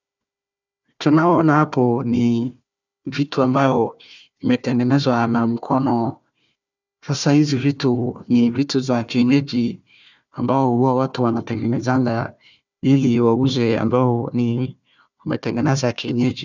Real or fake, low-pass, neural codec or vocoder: fake; 7.2 kHz; codec, 16 kHz, 1 kbps, FunCodec, trained on Chinese and English, 50 frames a second